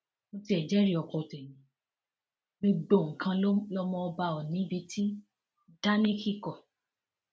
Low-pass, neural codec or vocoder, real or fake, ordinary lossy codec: none; none; real; none